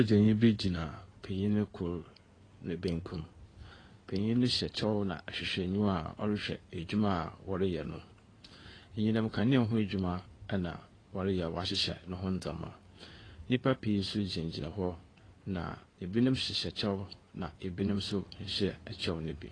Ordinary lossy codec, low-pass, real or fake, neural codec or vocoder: AAC, 32 kbps; 9.9 kHz; fake; codec, 16 kHz in and 24 kHz out, 2.2 kbps, FireRedTTS-2 codec